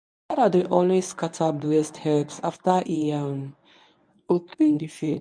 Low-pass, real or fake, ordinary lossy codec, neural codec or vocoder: 9.9 kHz; fake; none; codec, 24 kHz, 0.9 kbps, WavTokenizer, medium speech release version 1